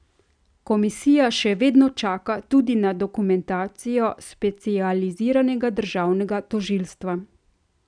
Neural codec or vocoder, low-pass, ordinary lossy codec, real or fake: none; 9.9 kHz; none; real